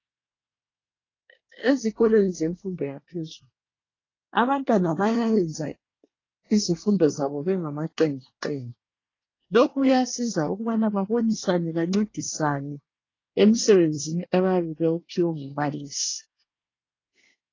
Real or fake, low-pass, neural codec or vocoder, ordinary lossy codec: fake; 7.2 kHz; codec, 24 kHz, 1 kbps, SNAC; AAC, 32 kbps